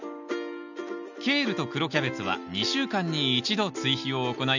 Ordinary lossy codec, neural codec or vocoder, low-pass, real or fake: none; none; 7.2 kHz; real